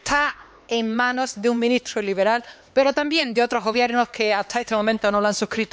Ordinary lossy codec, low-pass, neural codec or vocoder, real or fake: none; none; codec, 16 kHz, 4 kbps, X-Codec, HuBERT features, trained on LibriSpeech; fake